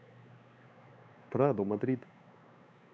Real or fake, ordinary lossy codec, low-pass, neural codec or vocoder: fake; none; none; codec, 16 kHz, 4 kbps, X-Codec, WavLM features, trained on Multilingual LibriSpeech